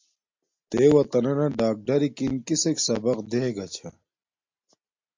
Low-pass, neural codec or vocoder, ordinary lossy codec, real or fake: 7.2 kHz; none; MP3, 48 kbps; real